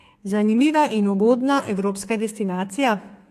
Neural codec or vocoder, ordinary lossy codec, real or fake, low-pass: codec, 32 kHz, 1.9 kbps, SNAC; AAC, 64 kbps; fake; 14.4 kHz